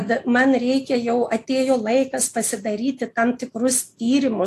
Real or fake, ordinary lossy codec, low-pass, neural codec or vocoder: real; AAC, 64 kbps; 14.4 kHz; none